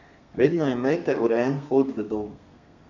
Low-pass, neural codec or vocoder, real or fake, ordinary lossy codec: 7.2 kHz; codec, 32 kHz, 1.9 kbps, SNAC; fake; none